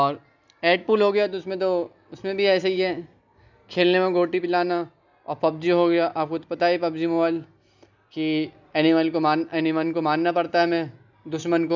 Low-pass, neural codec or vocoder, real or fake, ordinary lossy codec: 7.2 kHz; none; real; none